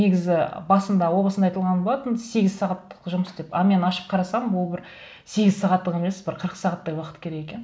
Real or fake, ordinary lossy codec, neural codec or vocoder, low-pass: real; none; none; none